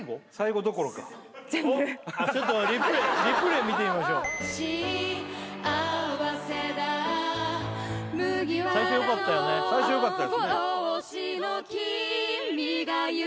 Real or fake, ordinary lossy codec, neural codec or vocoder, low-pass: real; none; none; none